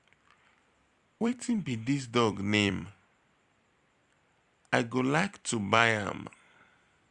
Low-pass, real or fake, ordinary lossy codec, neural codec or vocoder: 10.8 kHz; fake; Opus, 64 kbps; vocoder, 44.1 kHz, 128 mel bands every 256 samples, BigVGAN v2